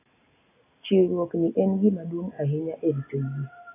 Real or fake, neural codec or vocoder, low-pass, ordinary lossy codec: real; none; 3.6 kHz; AAC, 16 kbps